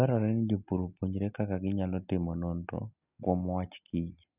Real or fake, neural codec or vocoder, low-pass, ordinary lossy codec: real; none; 3.6 kHz; none